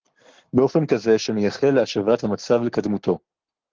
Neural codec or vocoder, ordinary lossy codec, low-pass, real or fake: codec, 44.1 kHz, 7.8 kbps, Pupu-Codec; Opus, 16 kbps; 7.2 kHz; fake